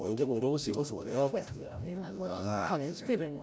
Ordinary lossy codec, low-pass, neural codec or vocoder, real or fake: none; none; codec, 16 kHz, 0.5 kbps, FreqCodec, larger model; fake